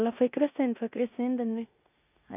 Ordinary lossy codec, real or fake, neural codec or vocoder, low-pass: none; fake; codec, 16 kHz in and 24 kHz out, 0.9 kbps, LongCat-Audio-Codec, four codebook decoder; 3.6 kHz